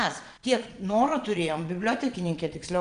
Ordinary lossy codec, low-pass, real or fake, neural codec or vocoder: MP3, 96 kbps; 9.9 kHz; fake; vocoder, 22.05 kHz, 80 mel bands, Vocos